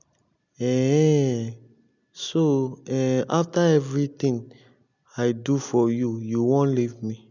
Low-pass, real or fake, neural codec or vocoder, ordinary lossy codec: 7.2 kHz; real; none; none